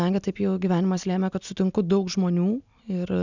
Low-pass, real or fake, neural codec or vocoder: 7.2 kHz; real; none